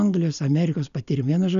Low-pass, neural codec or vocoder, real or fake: 7.2 kHz; none; real